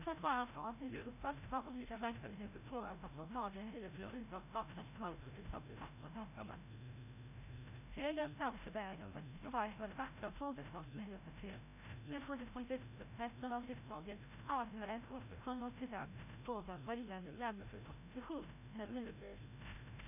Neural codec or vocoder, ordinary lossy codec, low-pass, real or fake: codec, 16 kHz, 0.5 kbps, FreqCodec, larger model; none; 3.6 kHz; fake